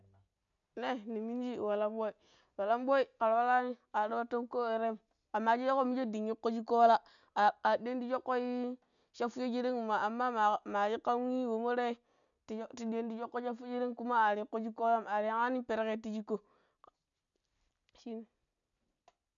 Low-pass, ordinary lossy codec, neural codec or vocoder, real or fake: 7.2 kHz; none; none; real